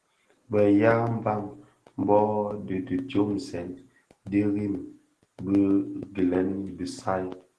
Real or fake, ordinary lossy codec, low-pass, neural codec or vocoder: real; Opus, 16 kbps; 10.8 kHz; none